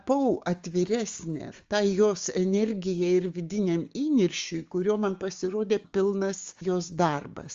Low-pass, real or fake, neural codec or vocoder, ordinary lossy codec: 7.2 kHz; fake; codec, 16 kHz, 6 kbps, DAC; Opus, 32 kbps